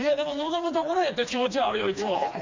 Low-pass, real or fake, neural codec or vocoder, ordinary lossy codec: 7.2 kHz; fake; codec, 16 kHz, 2 kbps, FreqCodec, smaller model; MP3, 64 kbps